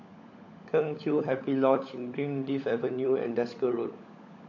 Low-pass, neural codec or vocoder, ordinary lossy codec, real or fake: 7.2 kHz; codec, 16 kHz, 16 kbps, FunCodec, trained on LibriTTS, 50 frames a second; AAC, 48 kbps; fake